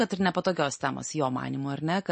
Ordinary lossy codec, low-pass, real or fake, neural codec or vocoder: MP3, 32 kbps; 10.8 kHz; real; none